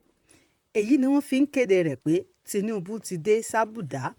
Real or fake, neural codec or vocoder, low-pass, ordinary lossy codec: fake; vocoder, 44.1 kHz, 128 mel bands, Pupu-Vocoder; 19.8 kHz; MP3, 96 kbps